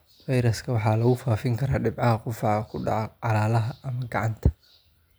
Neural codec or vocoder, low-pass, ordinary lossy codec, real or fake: none; none; none; real